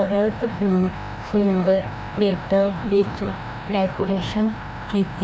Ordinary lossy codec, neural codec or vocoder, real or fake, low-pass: none; codec, 16 kHz, 1 kbps, FreqCodec, larger model; fake; none